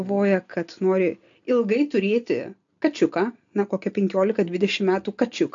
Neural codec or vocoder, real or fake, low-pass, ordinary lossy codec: none; real; 7.2 kHz; AAC, 64 kbps